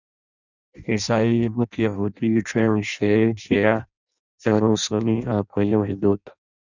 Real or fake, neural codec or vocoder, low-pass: fake; codec, 16 kHz in and 24 kHz out, 0.6 kbps, FireRedTTS-2 codec; 7.2 kHz